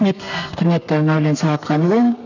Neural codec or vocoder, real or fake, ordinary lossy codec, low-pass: codec, 32 kHz, 1.9 kbps, SNAC; fake; none; 7.2 kHz